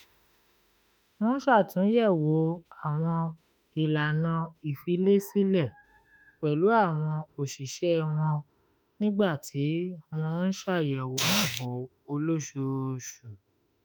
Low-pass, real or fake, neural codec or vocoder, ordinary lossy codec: none; fake; autoencoder, 48 kHz, 32 numbers a frame, DAC-VAE, trained on Japanese speech; none